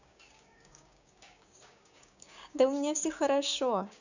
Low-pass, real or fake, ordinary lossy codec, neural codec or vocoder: 7.2 kHz; fake; none; codec, 16 kHz, 6 kbps, DAC